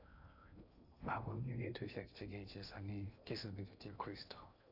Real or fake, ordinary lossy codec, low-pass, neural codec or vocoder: fake; none; 5.4 kHz; codec, 16 kHz in and 24 kHz out, 0.6 kbps, FocalCodec, streaming, 2048 codes